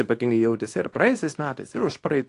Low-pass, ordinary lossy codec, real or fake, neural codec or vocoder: 10.8 kHz; AAC, 48 kbps; fake; codec, 24 kHz, 0.9 kbps, WavTokenizer, small release